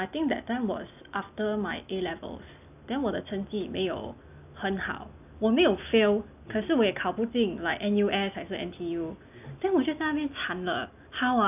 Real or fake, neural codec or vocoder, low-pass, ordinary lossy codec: real; none; 3.6 kHz; none